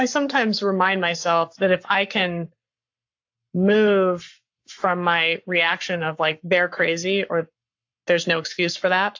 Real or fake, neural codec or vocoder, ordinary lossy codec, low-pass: fake; codec, 44.1 kHz, 7.8 kbps, Pupu-Codec; AAC, 48 kbps; 7.2 kHz